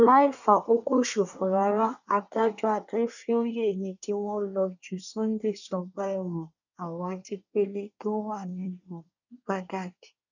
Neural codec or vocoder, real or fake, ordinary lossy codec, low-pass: codec, 24 kHz, 1 kbps, SNAC; fake; none; 7.2 kHz